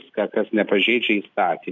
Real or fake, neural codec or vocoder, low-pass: real; none; 7.2 kHz